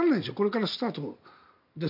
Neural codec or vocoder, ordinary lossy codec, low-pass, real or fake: none; none; 5.4 kHz; real